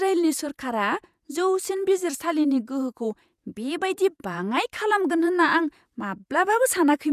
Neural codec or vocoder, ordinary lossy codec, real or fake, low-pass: vocoder, 48 kHz, 128 mel bands, Vocos; none; fake; 14.4 kHz